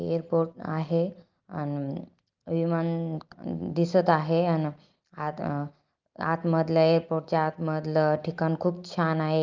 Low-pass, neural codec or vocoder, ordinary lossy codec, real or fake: 7.2 kHz; none; Opus, 32 kbps; real